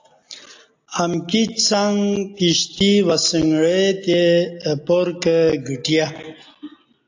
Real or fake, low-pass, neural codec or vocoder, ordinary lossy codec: real; 7.2 kHz; none; AAC, 48 kbps